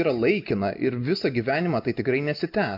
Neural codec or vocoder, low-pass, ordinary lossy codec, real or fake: none; 5.4 kHz; MP3, 48 kbps; real